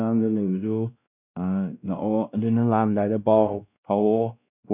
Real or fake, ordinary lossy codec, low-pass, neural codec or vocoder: fake; none; 3.6 kHz; codec, 16 kHz, 1 kbps, X-Codec, WavLM features, trained on Multilingual LibriSpeech